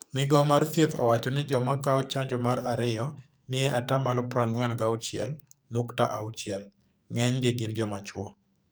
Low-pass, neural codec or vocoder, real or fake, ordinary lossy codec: none; codec, 44.1 kHz, 2.6 kbps, SNAC; fake; none